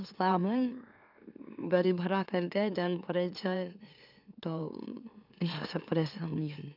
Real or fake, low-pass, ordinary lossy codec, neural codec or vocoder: fake; 5.4 kHz; none; autoencoder, 44.1 kHz, a latent of 192 numbers a frame, MeloTTS